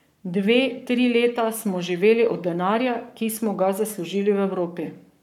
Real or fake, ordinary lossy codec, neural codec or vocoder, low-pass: fake; none; codec, 44.1 kHz, 7.8 kbps, Pupu-Codec; 19.8 kHz